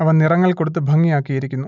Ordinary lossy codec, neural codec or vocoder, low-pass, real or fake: none; none; 7.2 kHz; real